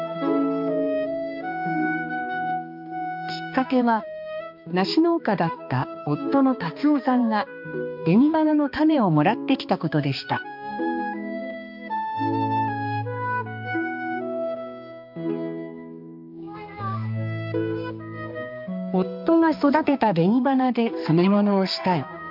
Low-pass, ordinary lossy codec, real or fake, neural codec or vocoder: 5.4 kHz; MP3, 48 kbps; fake; codec, 16 kHz, 2 kbps, X-Codec, HuBERT features, trained on balanced general audio